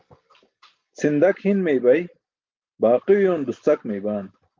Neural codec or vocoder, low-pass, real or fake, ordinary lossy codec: none; 7.2 kHz; real; Opus, 32 kbps